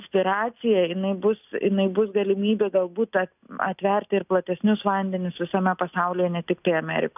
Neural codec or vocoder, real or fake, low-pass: none; real; 3.6 kHz